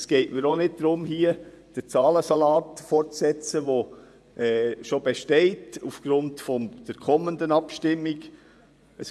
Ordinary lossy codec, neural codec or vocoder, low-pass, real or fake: none; vocoder, 24 kHz, 100 mel bands, Vocos; none; fake